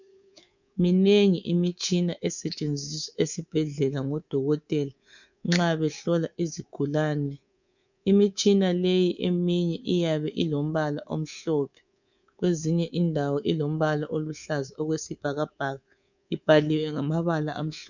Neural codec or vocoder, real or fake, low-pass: codec, 24 kHz, 3.1 kbps, DualCodec; fake; 7.2 kHz